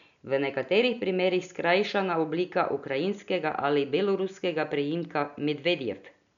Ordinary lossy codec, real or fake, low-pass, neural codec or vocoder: none; real; 7.2 kHz; none